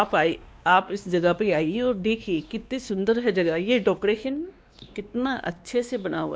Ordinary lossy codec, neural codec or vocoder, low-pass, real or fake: none; codec, 16 kHz, 2 kbps, X-Codec, WavLM features, trained on Multilingual LibriSpeech; none; fake